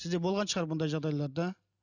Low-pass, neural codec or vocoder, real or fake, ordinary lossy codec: 7.2 kHz; none; real; none